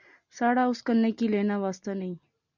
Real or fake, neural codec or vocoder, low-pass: real; none; 7.2 kHz